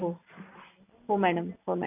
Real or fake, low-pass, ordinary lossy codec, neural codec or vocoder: real; 3.6 kHz; none; none